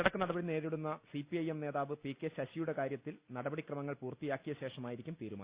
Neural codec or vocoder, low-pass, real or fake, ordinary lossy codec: none; 3.6 kHz; real; Opus, 64 kbps